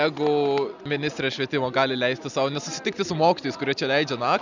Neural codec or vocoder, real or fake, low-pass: none; real; 7.2 kHz